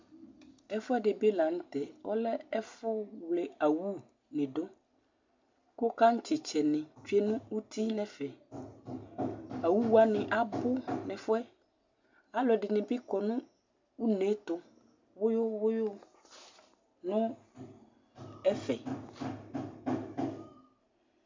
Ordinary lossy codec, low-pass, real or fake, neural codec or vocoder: MP3, 64 kbps; 7.2 kHz; real; none